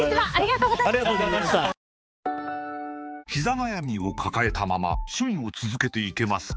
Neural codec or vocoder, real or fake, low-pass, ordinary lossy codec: codec, 16 kHz, 4 kbps, X-Codec, HuBERT features, trained on balanced general audio; fake; none; none